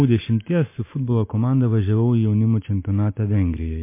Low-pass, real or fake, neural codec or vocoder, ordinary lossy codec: 3.6 kHz; real; none; MP3, 24 kbps